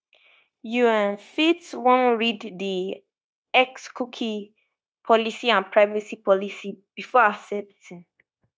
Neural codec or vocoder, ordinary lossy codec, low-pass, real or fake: codec, 16 kHz, 0.9 kbps, LongCat-Audio-Codec; none; none; fake